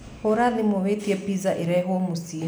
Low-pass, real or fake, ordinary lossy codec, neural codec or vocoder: none; real; none; none